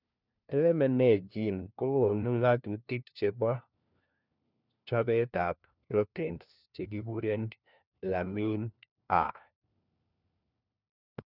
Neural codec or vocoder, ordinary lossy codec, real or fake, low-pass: codec, 16 kHz, 1 kbps, FunCodec, trained on LibriTTS, 50 frames a second; none; fake; 5.4 kHz